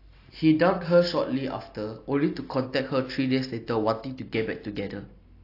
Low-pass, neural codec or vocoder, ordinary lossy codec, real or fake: 5.4 kHz; none; AAC, 32 kbps; real